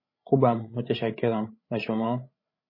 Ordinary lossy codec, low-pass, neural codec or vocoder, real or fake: MP3, 24 kbps; 5.4 kHz; codec, 16 kHz, 16 kbps, FreqCodec, larger model; fake